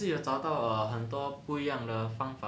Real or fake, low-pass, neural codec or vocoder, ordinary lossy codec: real; none; none; none